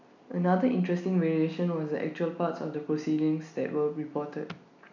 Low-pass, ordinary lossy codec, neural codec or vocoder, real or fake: 7.2 kHz; none; none; real